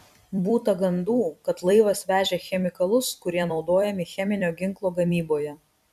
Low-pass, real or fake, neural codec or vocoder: 14.4 kHz; fake; vocoder, 44.1 kHz, 128 mel bands every 256 samples, BigVGAN v2